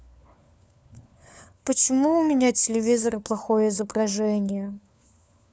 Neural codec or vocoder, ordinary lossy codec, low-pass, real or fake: codec, 16 kHz, 4 kbps, FunCodec, trained on LibriTTS, 50 frames a second; none; none; fake